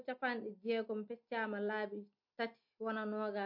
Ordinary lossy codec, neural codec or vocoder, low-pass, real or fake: MP3, 32 kbps; none; 5.4 kHz; real